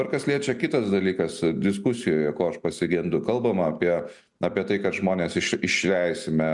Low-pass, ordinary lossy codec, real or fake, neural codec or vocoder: 10.8 kHz; MP3, 96 kbps; real; none